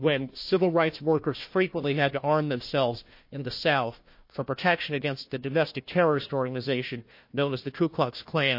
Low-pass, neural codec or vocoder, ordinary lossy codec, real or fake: 5.4 kHz; codec, 16 kHz, 1 kbps, FunCodec, trained on Chinese and English, 50 frames a second; MP3, 32 kbps; fake